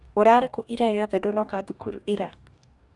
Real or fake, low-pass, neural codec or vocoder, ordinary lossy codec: fake; 10.8 kHz; codec, 44.1 kHz, 2.6 kbps, DAC; none